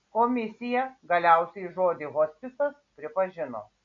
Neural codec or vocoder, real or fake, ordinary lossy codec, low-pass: none; real; MP3, 64 kbps; 7.2 kHz